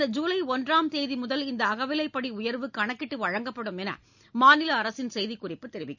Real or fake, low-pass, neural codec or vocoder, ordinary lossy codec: real; 7.2 kHz; none; none